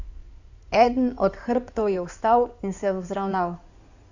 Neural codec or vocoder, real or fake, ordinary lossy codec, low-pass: codec, 16 kHz in and 24 kHz out, 2.2 kbps, FireRedTTS-2 codec; fake; none; 7.2 kHz